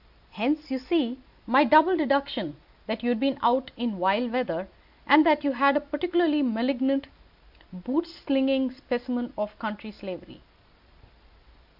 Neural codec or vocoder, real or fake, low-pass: none; real; 5.4 kHz